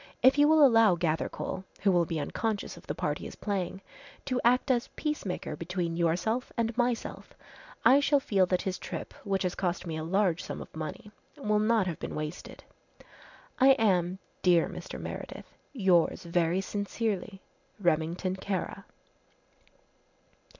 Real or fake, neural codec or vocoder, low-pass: real; none; 7.2 kHz